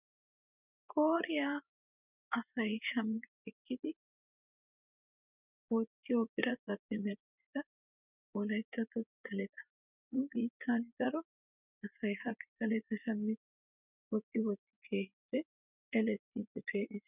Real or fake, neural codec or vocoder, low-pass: fake; vocoder, 24 kHz, 100 mel bands, Vocos; 3.6 kHz